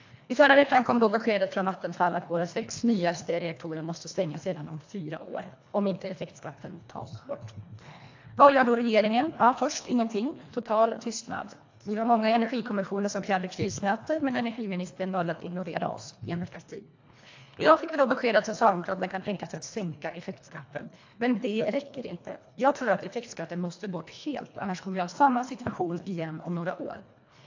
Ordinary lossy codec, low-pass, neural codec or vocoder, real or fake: AAC, 48 kbps; 7.2 kHz; codec, 24 kHz, 1.5 kbps, HILCodec; fake